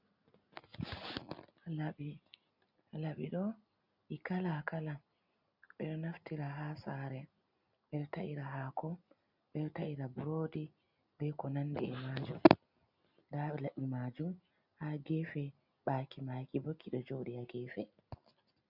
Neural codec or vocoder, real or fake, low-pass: none; real; 5.4 kHz